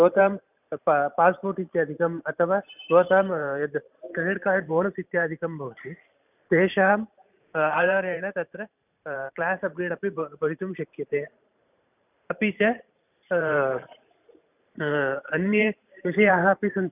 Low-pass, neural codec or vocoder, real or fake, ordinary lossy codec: 3.6 kHz; vocoder, 44.1 kHz, 128 mel bands every 512 samples, BigVGAN v2; fake; none